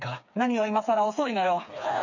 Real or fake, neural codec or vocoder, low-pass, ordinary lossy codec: fake; codec, 16 kHz, 4 kbps, FreqCodec, smaller model; 7.2 kHz; none